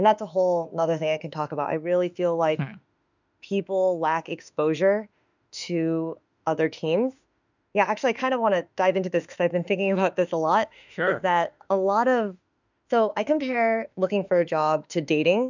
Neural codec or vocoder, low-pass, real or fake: autoencoder, 48 kHz, 32 numbers a frame, DAC-VAE, trained on Japanese speech; 7.2 kHz; fake